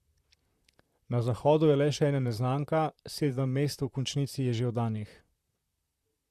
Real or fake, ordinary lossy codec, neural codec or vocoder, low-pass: fake; Opus, 64 kbps; vocoder, 44.1 kHz, 128 mel bands, Pupu-Vocoder; 14.4 kHz